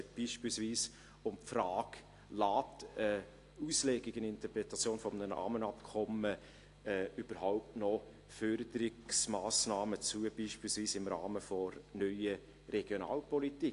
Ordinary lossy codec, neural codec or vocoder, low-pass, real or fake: AAC, 48 kbps; none; 10.8 kHz; real